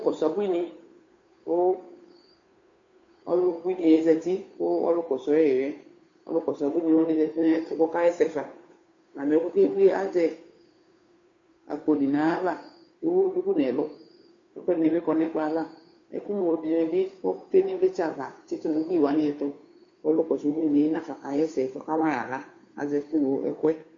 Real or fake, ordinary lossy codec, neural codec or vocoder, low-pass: fake; AAC, 48 kbps; codec, 16 kHz, 2 kbps, FunCodec, trained on Chinese and English, 25 frames a second; 7.2 kHz